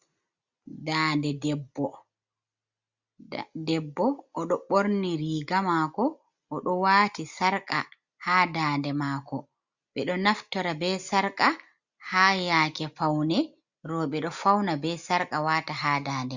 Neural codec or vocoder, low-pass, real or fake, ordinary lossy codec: none; 7.2 kHz; real; Opus, 64 kbps